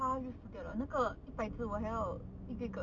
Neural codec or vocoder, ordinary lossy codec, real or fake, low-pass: none; none; real; 7.2 kHz